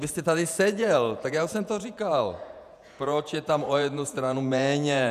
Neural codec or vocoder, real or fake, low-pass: vocoder, 48 kHz, 128 mel bands, Vocos; fake; 14.4 kHz